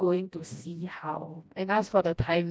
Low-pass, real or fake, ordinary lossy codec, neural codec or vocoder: none; fake; none; codec, 16 kHz, 1 kbps, FreqCodec, smaller model